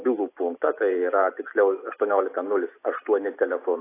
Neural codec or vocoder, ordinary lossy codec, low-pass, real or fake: none; AAC, 24 kbps; 3.6 kHz; real